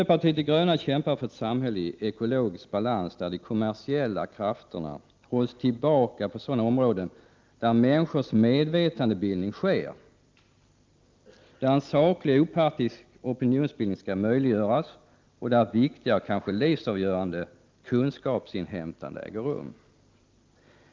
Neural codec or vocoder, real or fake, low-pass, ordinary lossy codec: none; real; 7.2 kHz; Opus, 24 kbps